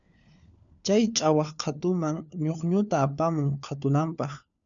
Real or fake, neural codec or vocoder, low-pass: fake; codec, 16 kHz, 4 kbps, FunCodec, trained on LibriTTS, 50 frames a second; 7.2 kHz